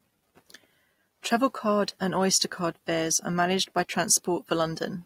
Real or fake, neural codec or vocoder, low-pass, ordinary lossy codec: real; none; 19.8 kHz; AAC, 48 kbps